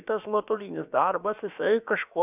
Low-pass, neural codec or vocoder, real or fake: 3.6 kHz; codec, 16 kHz, about 1 kbps, DyCAST, with the encoder's durations; fake